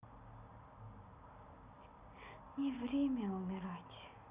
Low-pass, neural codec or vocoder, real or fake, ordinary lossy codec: 3.6 kHz; none; real; Opus, 64 kbps